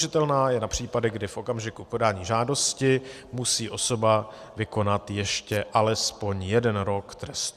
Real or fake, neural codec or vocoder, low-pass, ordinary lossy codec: real; none; 14.4 kHz; Opus, 64 kbps